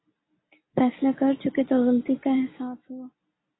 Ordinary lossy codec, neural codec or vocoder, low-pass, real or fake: AAC, 16 kbps; none; 7.2 kHz; real